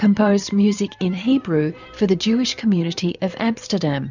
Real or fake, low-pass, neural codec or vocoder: fake; 7.2 kHz; vocoder, 44.1 kHz, 128 mel bands every 512 samples, BigVGAN v2